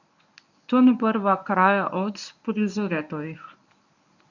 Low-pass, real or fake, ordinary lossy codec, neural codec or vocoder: 7.2 kHz; fake; none; codec, 24 kHz, 0.9 kbps, WavTokenizer, medium speech release version 1